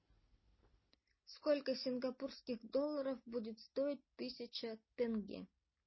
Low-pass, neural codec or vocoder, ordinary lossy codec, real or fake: 7.2 kHz; none; MP3, 24 kbps; real